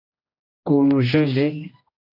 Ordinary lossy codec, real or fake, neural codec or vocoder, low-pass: AAC, 32 kbps; fake; codec, 16 kHz, 1 kbps, X-Codec, HuBERT features, trained on general audio; 5.4 kHz